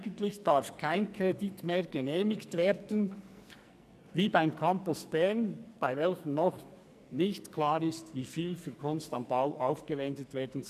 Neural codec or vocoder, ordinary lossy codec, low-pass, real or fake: codec, 44.1 kHz, 2.6 kbps, SNAC; none; 14.4 kHz; fake